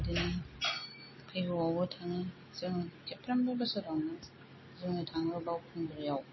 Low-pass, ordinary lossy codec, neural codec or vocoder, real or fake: 7.2 kHz; MP3, 24 kbps; none; real